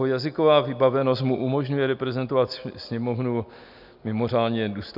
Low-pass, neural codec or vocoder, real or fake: 5.4 kHz; none; real